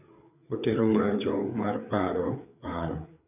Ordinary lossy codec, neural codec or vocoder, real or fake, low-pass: none; codec, 16 kHz, 4 kbps, FreqCodec, larger model; fake; 3.6 kHz